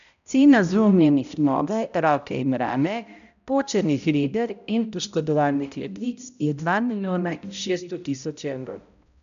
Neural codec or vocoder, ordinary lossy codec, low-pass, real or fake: codec, 16 kHz, 0.5 kbps, X-Codec, HuBERT features, trained on balanced general audio; none; 7.2 kHz; fake